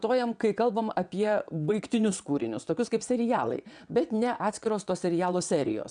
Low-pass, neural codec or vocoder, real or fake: 9.9 kHz; vocoder, 22.05 kHz, 80 mel bands, WaveNeXt; fake